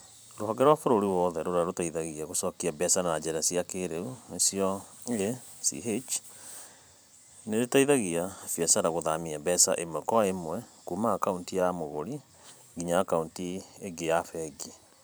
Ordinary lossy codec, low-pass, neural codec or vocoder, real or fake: none; none; vocoder, 44.1 kHz, 128 mel bands every 512 samples, BigVGAN v2; fake